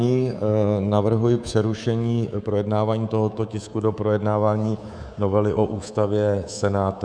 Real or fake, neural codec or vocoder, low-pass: fake; codec, 24 kHz, 3.1 kbps, DualCodec; 9.9 kHz